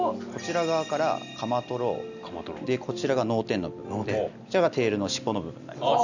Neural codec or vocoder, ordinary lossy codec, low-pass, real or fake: none; none; 7.2 kHz; real